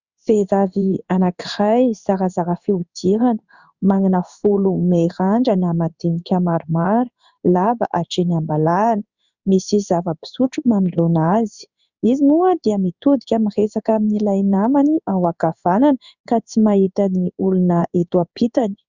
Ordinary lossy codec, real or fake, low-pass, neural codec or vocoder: Opus, 64 kbps; fake; 7.2 kHz; codec, 16 kHz in and 24 kHz out, 1 kbps, XY-Tokenizer